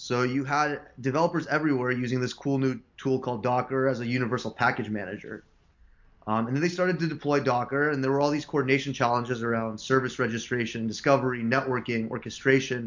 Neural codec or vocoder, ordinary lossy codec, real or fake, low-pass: none; MP3, 48 kbps; real; 7.2 kHz